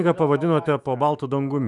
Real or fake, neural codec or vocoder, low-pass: fake; autoencoder, 48 kHz, 128 numbers a frame, DAC-VAE, trained on Japanese speech; 10.8 kHz